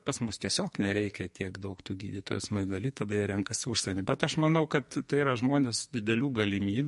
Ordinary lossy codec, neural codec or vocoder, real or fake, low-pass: MP3, 48 kbps; codec, 44.1 kHz, 2.6 kbps, SNAC; fake; 14.4 kHz